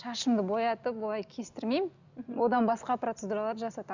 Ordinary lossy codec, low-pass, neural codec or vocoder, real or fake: none; 7.2 kHz; none; real